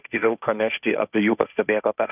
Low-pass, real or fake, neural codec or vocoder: 3.6 kHz; fake; codec, 16 kHz, 1.1 kbps, Voila-Tokenizer